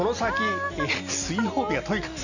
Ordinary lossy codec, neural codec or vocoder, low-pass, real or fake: none; none; 7.2 kHz; real